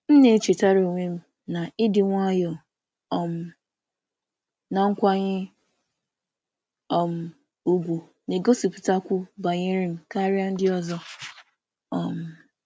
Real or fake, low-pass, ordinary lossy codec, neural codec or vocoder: real; none; none; none